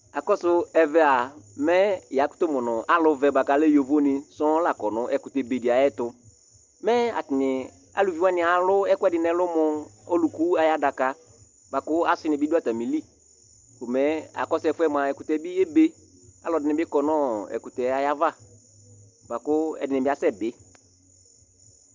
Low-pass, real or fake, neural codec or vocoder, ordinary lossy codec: 7.2 kHz; real; none; Opus, 24 kbps